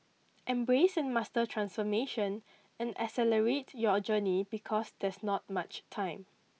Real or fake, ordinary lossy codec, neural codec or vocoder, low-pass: real; none; none; none